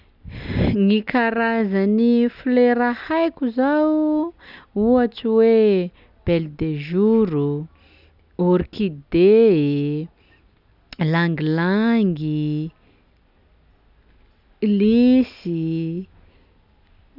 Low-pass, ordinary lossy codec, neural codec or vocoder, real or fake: 5.4 kHz; none; none; real